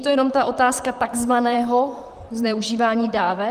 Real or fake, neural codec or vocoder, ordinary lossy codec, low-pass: fake; vocoder, 44.1 kHz, 128 mel bands, Pupu-Vocoder; Opus, 32 kbps; 14.4 kHz